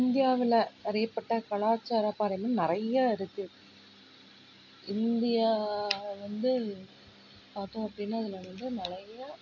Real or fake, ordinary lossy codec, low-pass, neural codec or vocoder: real; AAC, 48 kbps; 7.2 kHz; none